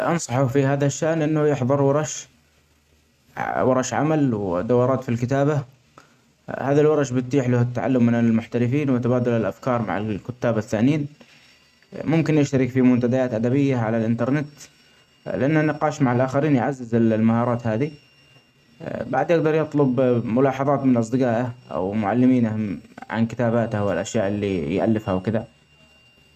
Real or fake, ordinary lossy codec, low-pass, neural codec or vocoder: real; none; 14.4 kHz; none